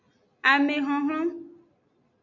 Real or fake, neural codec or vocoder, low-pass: real; none; 7.2 kHz